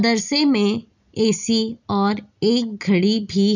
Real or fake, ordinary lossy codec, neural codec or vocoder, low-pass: real; none; none; 7.2 kHz